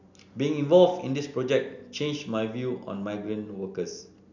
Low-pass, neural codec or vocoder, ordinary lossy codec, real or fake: 7.2 kHz; none; none; real